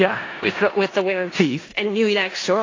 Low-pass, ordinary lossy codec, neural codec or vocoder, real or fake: 7.2 kHz; AAC, 32 kbps; codec, 16 kHz in and 24 kHz out, 0.4 kbps, LongCat-Audio-Codec, four codebook decoder; fake